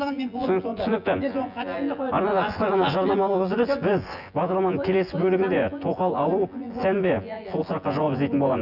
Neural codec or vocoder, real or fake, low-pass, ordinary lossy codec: vocoder, 24 kHz, 100 mel bands, Vocos; fake; 5.4 kHz; none